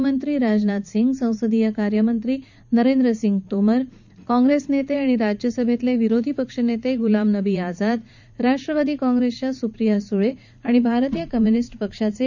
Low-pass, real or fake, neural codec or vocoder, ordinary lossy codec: 7.2 kHz; fake; vocoder, 44.1 kHz, 80 mel bands, Vocos; none